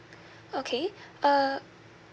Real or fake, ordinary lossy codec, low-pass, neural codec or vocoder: real; none; none; none